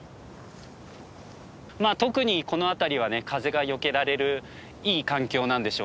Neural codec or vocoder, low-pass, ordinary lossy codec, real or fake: none; none; none; real